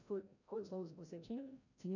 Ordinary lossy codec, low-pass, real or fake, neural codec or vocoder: none; 7.2 kHz; fake; codec, 16 kHz, 0.5 kbps, FreqCodec, larger model